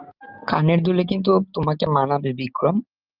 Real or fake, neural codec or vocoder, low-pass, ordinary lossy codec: real; none; 5.4 kHz; Opus, 24 kbps